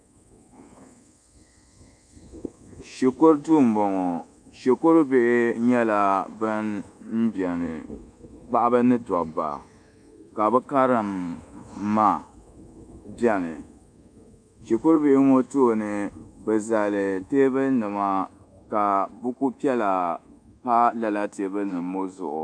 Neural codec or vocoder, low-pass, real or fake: codec, 24 kHz, 1.2 kbps, DualCodec; 9.9 kHz; fake